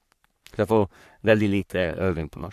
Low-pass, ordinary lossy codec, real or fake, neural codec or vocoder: 14.4 kHz; AAC, 96 kbps; fake; codec, 44.1 kHz, 3.4 kbps, Pupu-Codec